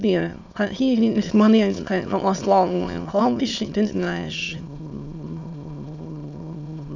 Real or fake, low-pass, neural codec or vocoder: fake; 7.2 kHz; autoencoder, 22.05 kHz, a latent of 192 numbers a frame, VITS, trained on many speakers